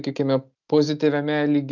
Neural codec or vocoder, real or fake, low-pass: none; real; 7.2 kHz